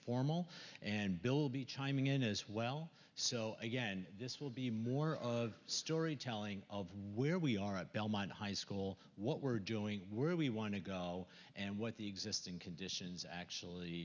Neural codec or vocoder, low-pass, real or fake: none; 7.2 kHz; real